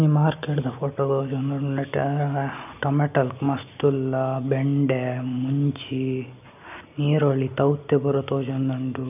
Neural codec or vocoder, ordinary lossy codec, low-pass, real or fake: none; none; 3.6 kHz; real